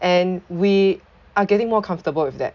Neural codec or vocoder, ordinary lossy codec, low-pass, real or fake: none; none; 7.2 kHz; real